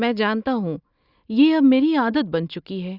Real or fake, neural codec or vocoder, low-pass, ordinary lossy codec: real; none; 5.4 kHz; Opus, 64 kbps